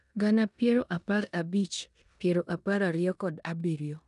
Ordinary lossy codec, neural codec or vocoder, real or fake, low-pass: none; codec, 16 kHz in and 24 kHz out, 0.9 kbps, LongCat-Audio-Codec, four codebook decoder; fake; 10.8 kHz